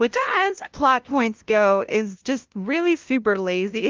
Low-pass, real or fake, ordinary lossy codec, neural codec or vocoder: 7.2 kHz; fake; Opus, 32 kbps; codec, 16 kHz, 0.5 kbps, FunCodec, trained on LibriTTS, 25 frames a second